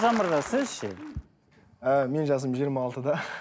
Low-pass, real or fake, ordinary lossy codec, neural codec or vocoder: none; real; none; none